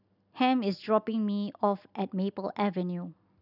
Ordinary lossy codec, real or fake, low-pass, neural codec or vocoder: none; real; 5.4 kHz; none